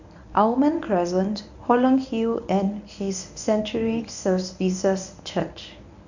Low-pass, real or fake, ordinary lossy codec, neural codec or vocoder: 7.2 kHz; fake; none; codec, 24 kHz, 0.9 kbps, WavTokenizer, medium speech release version 1